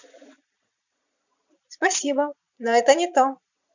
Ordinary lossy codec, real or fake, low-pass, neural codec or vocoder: none; fake; 7.2 kHz; vocoder, 22.05 kHz, 80 mel bands, Vocos